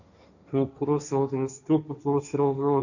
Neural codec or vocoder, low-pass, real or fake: codec, 16 kHz, 1.1 kbps, Voila-Tokenizer; 7.2 kHz; fake